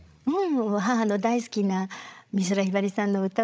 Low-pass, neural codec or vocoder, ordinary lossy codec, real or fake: none; codec, 16 kHz, 16 kbps, FreqCodec, larger model; none; fake